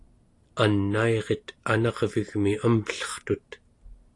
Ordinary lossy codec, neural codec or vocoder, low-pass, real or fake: AAC, 48 kbps; none; 10.8 kHz; real